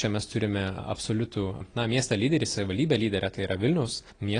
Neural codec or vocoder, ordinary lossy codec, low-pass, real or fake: none; AAC, 32 kbps; 9.9 kHz; real